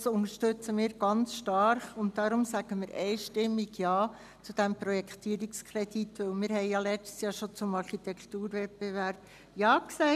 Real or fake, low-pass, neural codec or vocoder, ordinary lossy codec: real; 14.4 kHz; none; none